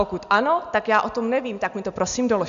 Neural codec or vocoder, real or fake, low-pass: none; real; 7.2 kHz